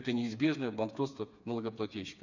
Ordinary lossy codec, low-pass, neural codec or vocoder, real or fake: none; 7.2 kHz; codec, 16 kHz, 4 kbps, FreqCodec, smaller model; fake